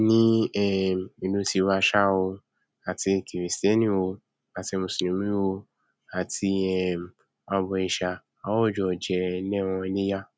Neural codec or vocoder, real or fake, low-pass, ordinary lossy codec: none; real; none; none